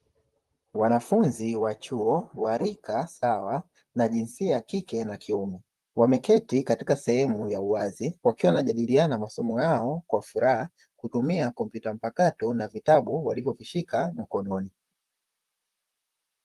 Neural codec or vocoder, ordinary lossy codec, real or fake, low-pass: vocoder, 44.1 kHz, 128 mel bands, Pupu-Vocoder; Opus, 32 kbps; fake; 14.4 kHz